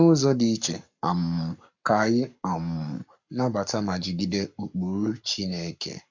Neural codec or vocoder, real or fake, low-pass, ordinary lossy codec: codec, 44.1 kHz, 7.8 kbps, Pupu-Codec; fake; 7.2 kHz; MP3, 64 kbps